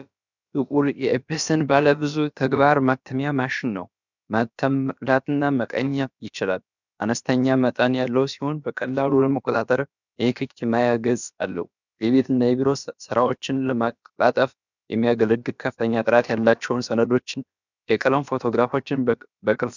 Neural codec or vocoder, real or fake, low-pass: codec, 16 kHz, about 1 kbps, DyCAST, with the encoder's durations; fake; 7.2 kHz